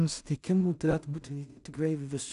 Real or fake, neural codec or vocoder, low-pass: fake; codec, 16 kHz in and 24 kHz out, 0.4 kbps, LongCat-Audio-Codec, two codebook decoder; 10.8 kHz